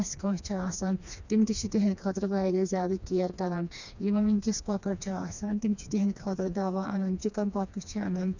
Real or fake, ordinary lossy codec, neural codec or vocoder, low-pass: fake; none; codec, 16 kHz, 2 kbps, FreqCodec, smaller model; 7.2 kHz